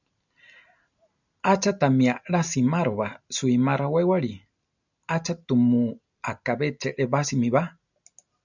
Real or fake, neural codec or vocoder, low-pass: real; none; 7.2 kHz